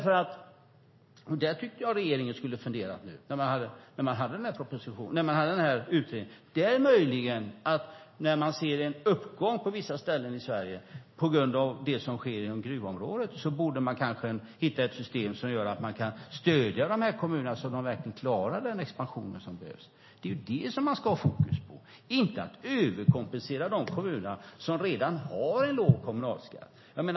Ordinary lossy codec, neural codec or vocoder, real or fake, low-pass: MP3, 24 kbps; none; real; 7.2 kHz